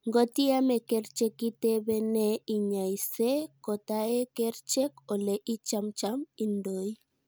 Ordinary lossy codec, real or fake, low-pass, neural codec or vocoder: none; real; none; none